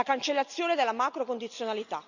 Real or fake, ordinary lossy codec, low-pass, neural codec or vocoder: real; none; 7.2 kHz; none